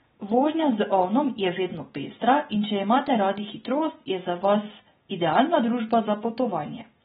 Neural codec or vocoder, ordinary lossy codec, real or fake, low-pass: none; AAC, 16 kbps; real; 19.8 kHz